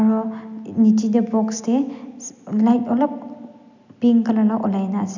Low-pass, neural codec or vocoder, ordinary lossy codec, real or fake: 7.2 kHz; none; none; real